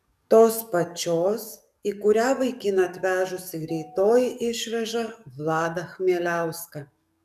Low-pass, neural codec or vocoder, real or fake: 14.4 kHz; codec, 44.1 kHz, 7.8 kbps, DAC; fake